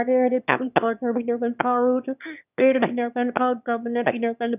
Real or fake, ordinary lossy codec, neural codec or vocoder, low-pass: fake; none; autoencoder, 22.05 kHz, a latent of 192 numbers a frame, VITS, trained on one speaker; 3.6 kHz